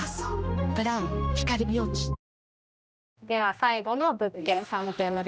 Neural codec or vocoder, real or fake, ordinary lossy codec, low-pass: codec, 16 kHz, 1 kbps, X-Codec, HuBERT features, trained on general audio; fake; none; none